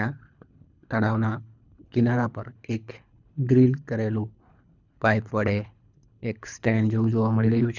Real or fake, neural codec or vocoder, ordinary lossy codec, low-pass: fake; codec, 24 kHz, 3 kbps, HILCodec; none; 7.2 kHz